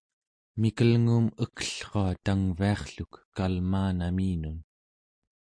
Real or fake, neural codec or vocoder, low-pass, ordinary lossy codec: real; none; 9.9 kHz; MP3, 48 kbps